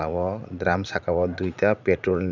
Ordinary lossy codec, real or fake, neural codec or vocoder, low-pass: none; fake; vocoder, 44.1 kHz, 80 mel bands, Vocos; 7.2 kHz